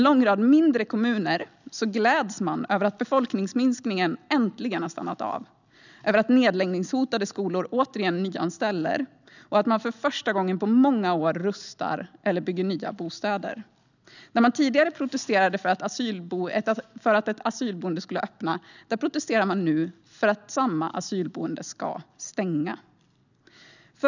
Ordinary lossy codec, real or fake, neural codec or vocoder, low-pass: none; real; none; 7.2 kHz